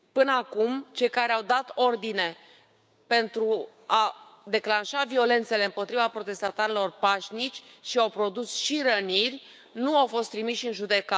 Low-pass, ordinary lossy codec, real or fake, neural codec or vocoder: none; none; fake; codec, 16 kHz, 6 kbps, DAC